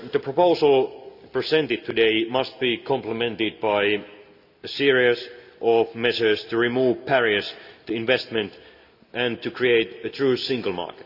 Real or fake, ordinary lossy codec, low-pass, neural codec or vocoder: real; Opus, 64 kbps; 5.4 kHz; none